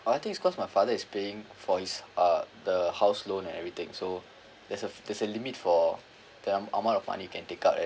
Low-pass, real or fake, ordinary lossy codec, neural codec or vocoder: none; real; none; none